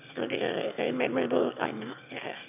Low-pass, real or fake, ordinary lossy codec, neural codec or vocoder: 3.6 kHz; fake; none; autoencoder, 22.05 kHz, a latent of 192 numbers a frame, VITS, trained on one speaker